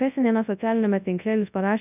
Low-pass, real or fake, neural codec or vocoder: 3.6 kHz; fake; codec, 24 kHz, 0.9 kbps, WavTokenizer, large speech release